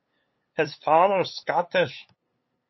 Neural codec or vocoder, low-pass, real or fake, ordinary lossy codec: codec, 16 kHz, 8 kbps, FunCodec, trained on LibriTTS, 25 frames a second; 7.2 kHz; fake; MP3, 24 kbps